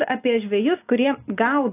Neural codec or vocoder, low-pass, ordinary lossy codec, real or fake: none; 3.6 kHz; AAC, 24 kbps; real